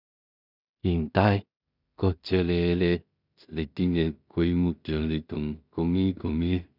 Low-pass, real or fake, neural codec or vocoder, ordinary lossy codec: 5.4 kHz; fake; codec, 16 kHz in and 24 kHz out, 0.4 kbps, LongCat-Audio-Codec, two codebook decoder; none